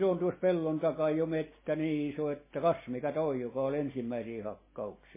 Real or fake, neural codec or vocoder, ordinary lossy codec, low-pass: real; none; MP3, 16 kbps; 3.6 kHz